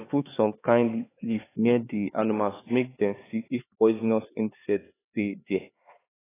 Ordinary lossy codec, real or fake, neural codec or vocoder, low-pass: AAC, 16 kbps; fake; codec, 16 kHz, 4 kbps, X-Codec, HuBERT features, trained on LibriSpeech; 3.6 kHz